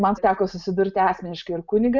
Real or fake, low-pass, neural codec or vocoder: real; 7.2 kHz; none